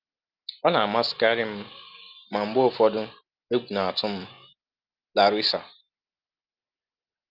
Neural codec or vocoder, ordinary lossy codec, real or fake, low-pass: none; Opus, 32 kbps; real; 5.4 kHz